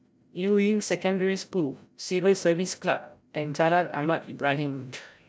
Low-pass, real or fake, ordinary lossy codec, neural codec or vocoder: none; fake; none; codec, 16 kHz, 0.5 kbps, FreqCodec, larger model